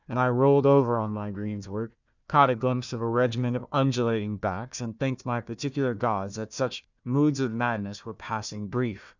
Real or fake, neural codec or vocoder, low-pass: fake; codec, 16 kHz, 1 kbps, FunCodec, trained on Chinese and English, 50 frames a second; 7.2 kHz